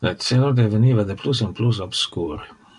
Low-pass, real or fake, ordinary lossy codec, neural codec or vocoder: 10.8 kHz; fake; MP3, 96 kbps; vocoder, 44.1 kHz, 128 mel bands every 256 samples, BigVGAN v2